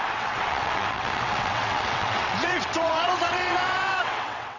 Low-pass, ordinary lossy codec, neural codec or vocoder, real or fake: 7.2 kHz; none; vocoder, 22.05 kHz, 80 mel bands, WaveNeXt; fake